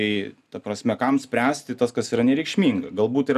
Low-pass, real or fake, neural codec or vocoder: 14.4 kHz; real; none